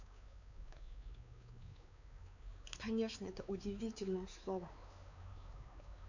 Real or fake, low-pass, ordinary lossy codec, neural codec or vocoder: fake; 7.2 kHz; none; codec, 16 kHz, 4 kbps, X-Codec, WavLM features, trained on Multilingual LibriSpeech